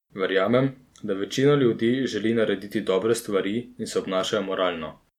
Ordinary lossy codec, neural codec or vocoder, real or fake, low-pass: MP3, 96 kbps; none; real; 19.8 kHz